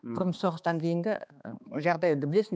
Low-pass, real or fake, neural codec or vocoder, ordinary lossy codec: none; fake; codec, 16 kHz, 2 kbps, X-Codec, HuBERT features, trained on balanced general audio; none